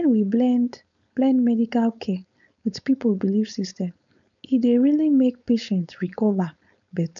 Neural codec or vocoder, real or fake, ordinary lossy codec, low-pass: codec, 16 kHz, 4.8 kbps, FACodec; fake; none; 7.2 kHz